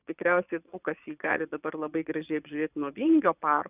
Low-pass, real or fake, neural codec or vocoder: 3.6 kHz; fake; vocoder, 22.05 kHz, 80 mel bands, Vocos